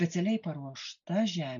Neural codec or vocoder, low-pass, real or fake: none; 7.2 kHz; real